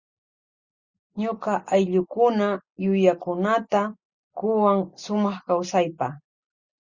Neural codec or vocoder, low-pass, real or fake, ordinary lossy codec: none; 7.2 kHz; real; Opus, 64 kbps